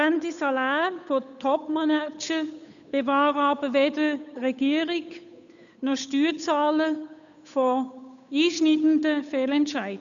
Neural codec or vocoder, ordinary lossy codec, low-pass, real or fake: codec, 16 kHz, 8 kbps, FunCodec, trained on Chinese and English, 25 frames a second; none; 7.2 kHz; fake